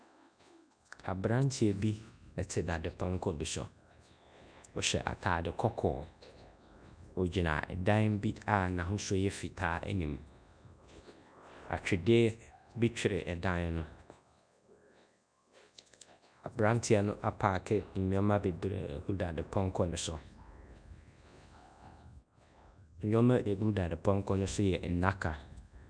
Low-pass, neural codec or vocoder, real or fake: 9.9 kHz; codec, 24 kHz, 0.9 kbps, WavTokenizer, large speech release; fake